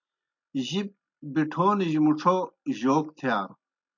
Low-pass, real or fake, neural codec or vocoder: 7.2 kHz; real; none